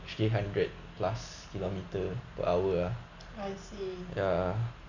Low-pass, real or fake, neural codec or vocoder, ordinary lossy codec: 7.2 kHz; real; none; none